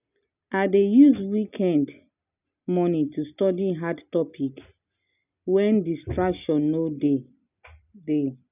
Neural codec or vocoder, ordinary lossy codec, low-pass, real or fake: none; none; 3.6 kHz; real